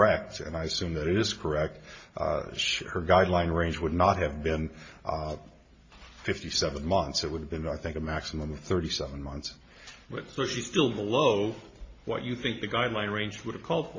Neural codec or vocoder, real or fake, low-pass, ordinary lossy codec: none; real; 7.2 kHz; MP3, 32 kbps